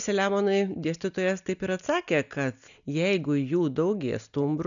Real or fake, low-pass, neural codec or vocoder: real; 7.2 kHz; none